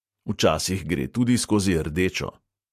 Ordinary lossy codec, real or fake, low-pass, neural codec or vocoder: MP3, 64 kbps; real; 14.4 kHz; none